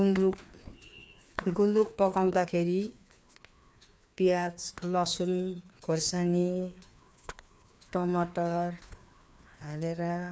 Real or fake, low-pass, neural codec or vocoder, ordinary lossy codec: fake; none; codec, 16 kHz, 2 kbps, FreqCodec, larger model; none